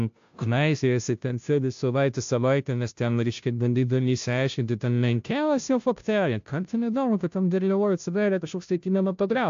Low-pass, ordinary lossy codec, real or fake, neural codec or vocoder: 7.2 kHz; AAC, 64 kbps; fake; codec, 16 kHz, 0.5 kbps, FunCodec, trained on Chinese and English, 25 frames a second